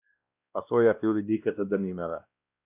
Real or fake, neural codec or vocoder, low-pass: fake; codec, 16 kHz, 1 kbps, X-Codec, WavLM features, trained on Multilingual LibriSpeech; 3.6 kHz